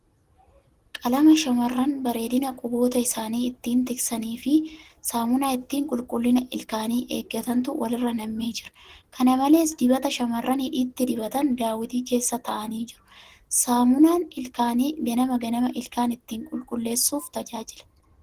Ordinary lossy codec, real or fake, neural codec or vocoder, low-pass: Opus, 16 kbps; real; none; 14.4 kHz